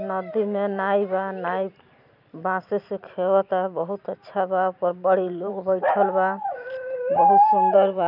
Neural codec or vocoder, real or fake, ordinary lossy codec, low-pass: vocoder, 44.1 kHz, 128 mel bands every 512 samples, BigVGAN v2; fake; none; 5.4 kHz